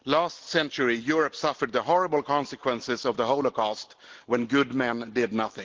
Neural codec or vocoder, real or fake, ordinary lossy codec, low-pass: none; real; Opus, 16 kbps; 7.2 kHz